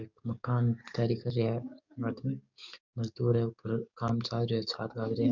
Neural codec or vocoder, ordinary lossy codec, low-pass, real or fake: codec, 44.1 kHz, 7.8 kbps, DAC; none; 7.2 kHz; fake